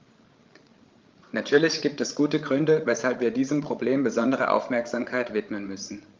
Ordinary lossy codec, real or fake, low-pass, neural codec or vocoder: Opus, 32 kbps; fake; 7.2 kHz; codec, 16 kHz, 16 kbps, FreqCodec, larger model